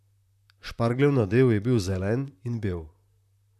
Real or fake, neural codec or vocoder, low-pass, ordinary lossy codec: fake; vocoder, 44.1 kHz, 128 mel bands, Pupu-Vocoder; 14.4 kHz; none